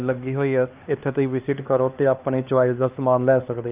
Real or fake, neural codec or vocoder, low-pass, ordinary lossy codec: fake; codec, 16 kHz, 2 kbps, X-Codec, HuBERT features, trained on LibriSpeech; 3.6 kHz; Opus, 32 kbps